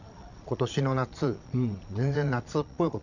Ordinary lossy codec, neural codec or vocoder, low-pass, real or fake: none; vocoder, 22.05 kHz, 80 mel bands, Vocos; 7.2 kHz; fake